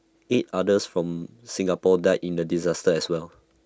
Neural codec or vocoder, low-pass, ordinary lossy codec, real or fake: none; none; none; real